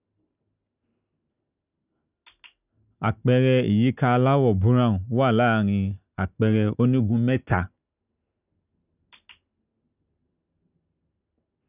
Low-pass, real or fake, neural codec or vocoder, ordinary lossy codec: 3.6 kHz; real; none; none